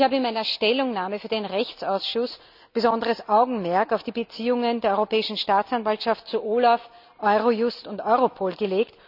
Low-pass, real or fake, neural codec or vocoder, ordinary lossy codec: 5.4 kHz; real; none; none